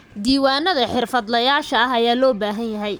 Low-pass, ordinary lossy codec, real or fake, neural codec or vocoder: none; none; fake; codec, 44.1 kHz, 7.8 kbps, Pupu-Codec